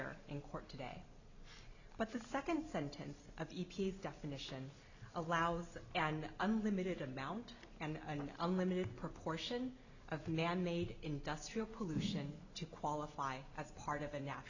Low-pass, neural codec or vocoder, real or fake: 7.2 kHz; none; real